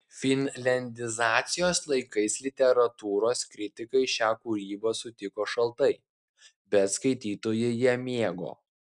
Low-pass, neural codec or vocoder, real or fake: 10.8 kHz; none; real